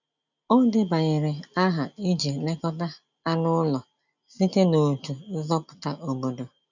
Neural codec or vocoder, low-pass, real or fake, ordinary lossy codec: none; 7.2 kHz; real; none